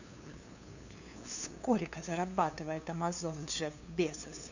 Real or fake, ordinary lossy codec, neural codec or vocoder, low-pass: fake; none; codec, 16 kHz, 2 kbps, FunCodec, trained on LibriTTS, 25 frames a second; 7.2 kHz